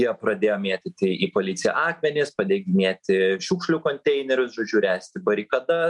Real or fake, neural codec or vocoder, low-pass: real; none; 10.8 kHz